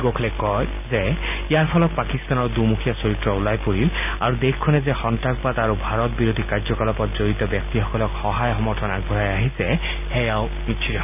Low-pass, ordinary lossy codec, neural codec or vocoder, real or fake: 3.6 kHz; none; none; real